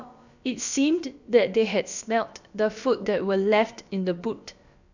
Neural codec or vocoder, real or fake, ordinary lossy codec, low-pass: codec, 16 kHz, about 1 kbps, DyCAST, with the encoder's durations; fake; none; 7.2 kHz